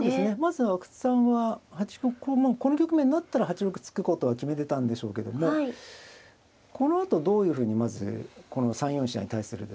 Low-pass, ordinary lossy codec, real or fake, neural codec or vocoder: none; none; real; none